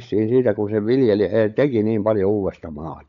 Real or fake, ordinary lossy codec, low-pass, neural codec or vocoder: fake; none; 7.2 kHz; codec, 16 kHz, 16 kbps, FunCodec, trained on LibriTTS, 50 frames a second